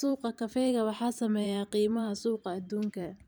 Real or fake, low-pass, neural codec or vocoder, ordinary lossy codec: fake; none; vocoder, 44.1 kHz, 128 mel bands every 512 samples, BigVGAN v2; none